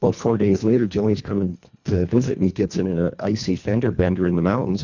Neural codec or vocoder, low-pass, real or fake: codec, 24 kHz, 1.5 kbps, HILCodec; 7.2 kHz; fake